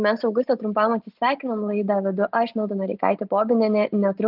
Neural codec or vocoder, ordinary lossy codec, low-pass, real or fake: none; Opus, 24 kbps; 5.4 kHz; real